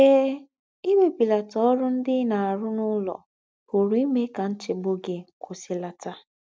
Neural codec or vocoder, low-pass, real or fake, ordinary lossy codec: none; none; real; none